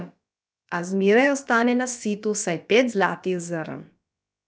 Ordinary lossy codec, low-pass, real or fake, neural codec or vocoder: none; none; fake; codec, 16 kHz, about 1 kbps, DyCAST, with the encoder's durations